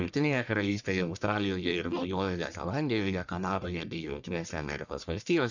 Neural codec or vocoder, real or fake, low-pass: codec, 44.1 kHz, 1.7 kbps, Pupu-Codec; fake; 7.2 kHz